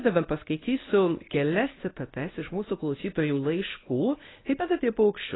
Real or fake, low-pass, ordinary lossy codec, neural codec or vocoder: fake; 7.2 kHz; AAC, 16 kbps; codec, 24 kHz, 0.9 kbps, WavTokenizer, medium speech release version 1